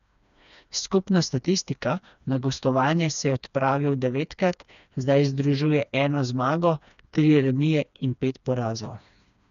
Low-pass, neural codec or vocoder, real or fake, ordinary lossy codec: 7.2 kHz; codec, 16 kHz, 2 kbps, FreqCodec, smaller model; fake; none